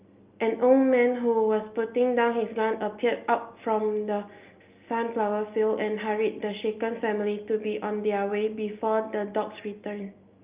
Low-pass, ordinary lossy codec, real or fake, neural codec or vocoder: 3.6 kHz; Opus, 24 kbps; real; none